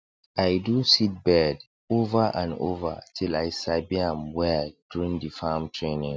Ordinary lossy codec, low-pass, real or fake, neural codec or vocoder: none; none; real; none